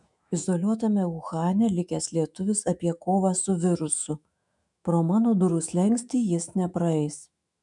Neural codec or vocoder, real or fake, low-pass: autoencoder, 48 kHz, 128 numbers a frame, DAC-VAE, trained on Japanese speech; fake; 10.8 kHz